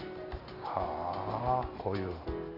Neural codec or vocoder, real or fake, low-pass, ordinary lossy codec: none; real; 5.4 kHz; none